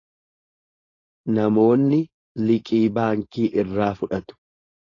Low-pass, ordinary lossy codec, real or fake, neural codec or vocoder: 7.2 kHz; AAC, 32 kbps; fake; codec, 16 kHz, 4.8 kbps, FACodec